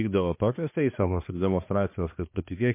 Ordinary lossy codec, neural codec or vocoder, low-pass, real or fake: MP3, 24 kbps; codec, 16 kHz, 2 kbps, X-Codec, HuBERT features, trained on balanced general audio; 3.6 kHz; fake